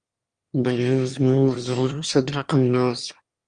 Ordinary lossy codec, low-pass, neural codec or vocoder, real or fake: Opus, 32 kbps; 9.9 kHz; autoencoder, 22.05 kHz, a latent of 192 numbers a frame, VITS, trained on one speaker; fake